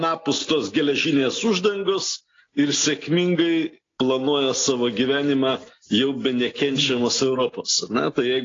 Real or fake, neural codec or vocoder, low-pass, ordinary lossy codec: real; none; 7.2 kHz; AAC, 32 kbps